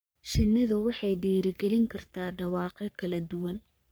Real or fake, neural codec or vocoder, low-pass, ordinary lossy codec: fake; codec, 44.1 kHz, 3.4 kbps, Pupu-Codec; none; none